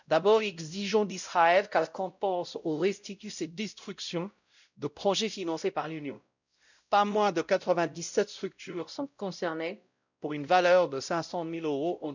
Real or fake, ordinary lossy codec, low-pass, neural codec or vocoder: fake; none; 7.2 kHz; codec, 16 kHz, 0.5 kbps, X-Codec, WavLM features, trained on Multilingual LibriSpeech